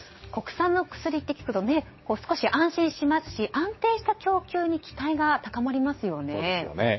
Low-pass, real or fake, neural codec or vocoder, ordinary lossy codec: 7.2 kHz; fake; codec, 16 kHz, 6 kbps, DAC; MP3, 24 kbps